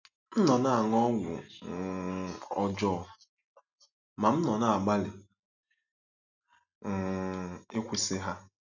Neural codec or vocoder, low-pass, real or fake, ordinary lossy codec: none; 7.2 kHz; real; none